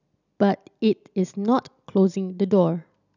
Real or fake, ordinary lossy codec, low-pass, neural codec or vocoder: real; none; 7.2 kHz; none